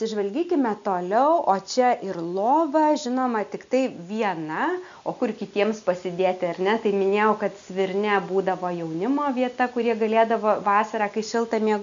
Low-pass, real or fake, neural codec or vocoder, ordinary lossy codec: 7.2 kHz; real; none; MP3, 64 kbps